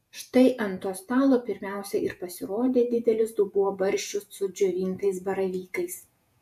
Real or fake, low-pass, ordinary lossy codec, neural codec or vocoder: fake; 14.4 kHz; AAC, 96 kbps; vocoder, 48 kHz, 128 mel bands, Vocos